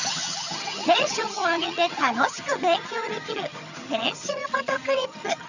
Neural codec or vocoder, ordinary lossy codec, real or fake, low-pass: vocoder, 22.05 kHz, 80 mel bands, HiFi-GAN; none; fake; 7.2 kHz